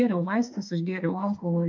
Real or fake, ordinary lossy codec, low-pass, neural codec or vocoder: fake; MP3, 64 kbps; 7.2 kHz; autoencoder, 48 kHz, 32 numbers a frame, DAC-VAE, trained on Japanese speech